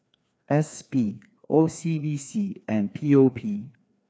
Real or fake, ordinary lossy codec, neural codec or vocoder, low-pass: fake; none; codec, 16 kHz, 2 kbps, FreqCodec, larger model; none